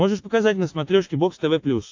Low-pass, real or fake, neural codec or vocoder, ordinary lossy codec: 7.2 kHz; real; none; AAC, 48 kbps